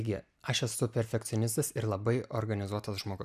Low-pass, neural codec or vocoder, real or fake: 14.4 kHz; none; real